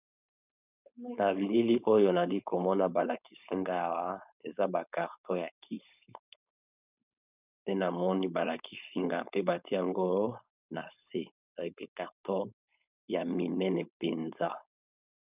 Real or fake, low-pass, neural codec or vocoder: fake; 3.6 kHz; codec, 16 kHz, 4.8 kbps, FACodec